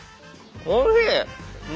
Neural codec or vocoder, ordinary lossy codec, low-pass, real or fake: none; none; none; real